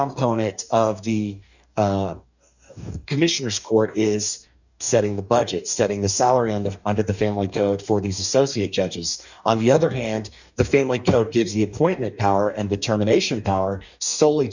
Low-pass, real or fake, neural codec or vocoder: 7.2 kHz; fake; codec, 44.1 kHz, 2.6 kbps, DAC